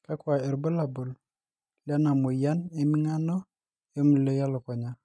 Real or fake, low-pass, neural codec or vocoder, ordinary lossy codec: real; none; none; none